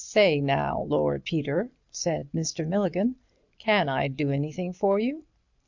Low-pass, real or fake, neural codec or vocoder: 7.2 kHz; real; none